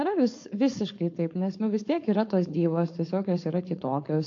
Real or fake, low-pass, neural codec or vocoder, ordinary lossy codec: fake; 7.2 kHz; codec, 16 kHz, 4.8 kbps, FACodec; AAC, 48 kbps